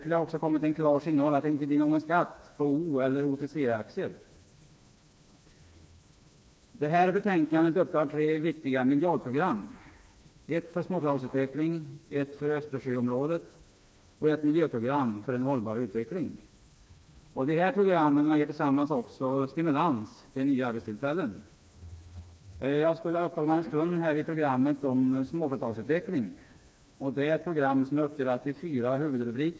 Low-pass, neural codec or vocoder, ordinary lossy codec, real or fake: none; codec, 16 kHz, 2 kbps, FreqCodec, smaller model; none; fake